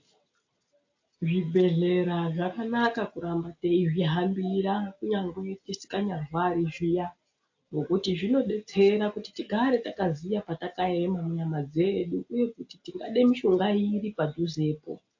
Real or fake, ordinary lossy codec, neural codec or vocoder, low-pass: real; AAC, 48 kbps; none; 7.2 kHz